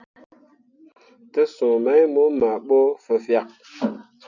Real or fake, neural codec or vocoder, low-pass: real; none; 7.2 kHz